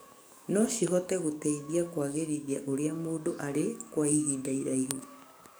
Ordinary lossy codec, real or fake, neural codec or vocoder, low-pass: none; fake; codec, 44.1 kHz, 7.8 kbps, DAC; none